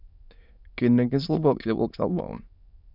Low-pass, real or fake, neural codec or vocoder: 5.4 kHz; fake; autoencoder, 22.05 kHz, a latent of 192 numbers a frame, VITS, trained on many speakers